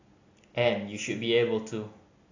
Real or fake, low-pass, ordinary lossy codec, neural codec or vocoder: real; 7.2 kHz; AAC, 48 kbps; none